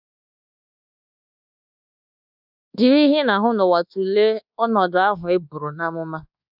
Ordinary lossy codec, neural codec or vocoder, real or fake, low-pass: none; codec, 24 kHz, 1.2 kbps, DualCodec; fake; 5.4 kHz